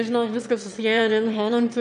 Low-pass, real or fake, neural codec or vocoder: 9.9 kHz; fake; autoencoder, 22.05 kHz, a latent of 192 numbers a frame, VITS, trained on one speaker